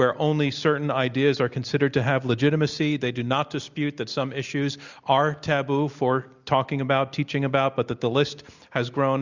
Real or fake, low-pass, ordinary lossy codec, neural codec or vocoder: real; 7.2 kHz; Opus, 64 kbps; none